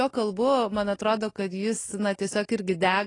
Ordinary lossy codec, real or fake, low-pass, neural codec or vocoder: AAC, 32 kbps; real; 10.8 kHz; none